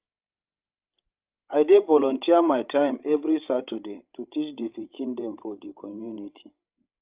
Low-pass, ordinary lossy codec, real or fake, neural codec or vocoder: 3.6 kHz; Opus, 32 kbps; fake; codec, 16 kHz, 16 kbps, FreqCodec, larger model